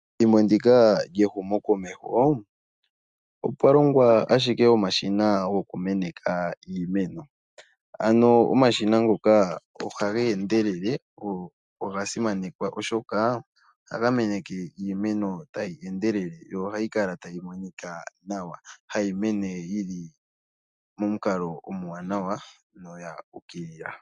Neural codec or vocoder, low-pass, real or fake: none; 10.8 kHz; real